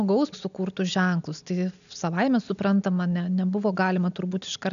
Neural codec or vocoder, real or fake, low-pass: none; real; 7.2 kHz